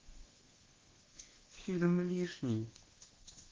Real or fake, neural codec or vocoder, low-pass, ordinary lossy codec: fake; codec, 24 kHz, 1.2 kbps, DualCodec; 7.2 kHz; Opus, 16 kbps